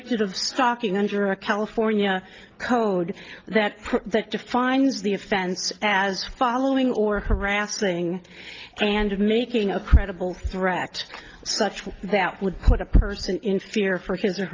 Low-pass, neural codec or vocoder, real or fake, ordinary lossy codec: 7.2 kHz; none; real; Opus, 32 kbps